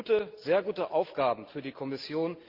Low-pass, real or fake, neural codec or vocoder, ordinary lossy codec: 5.4 kHz; real; none; Opus, 32 kbps